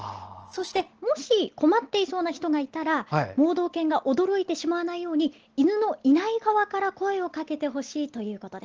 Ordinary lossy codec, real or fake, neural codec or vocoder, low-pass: Opus, 16 kbps; real; none; 7.2 kHz